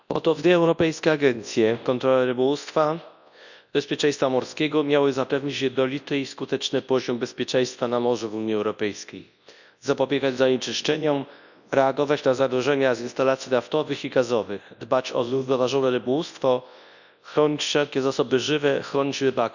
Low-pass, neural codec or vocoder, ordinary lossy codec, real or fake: 7.2 kHz; codec, 24 kHz, 0.9 kbps, WavTokenizer, large speech release; none; fake